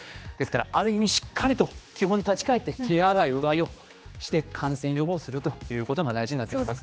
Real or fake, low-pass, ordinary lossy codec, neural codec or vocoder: fake; none; none; codec, 16 kHz, 2 kbps, X-Codec, HuBERT features, trained on general audio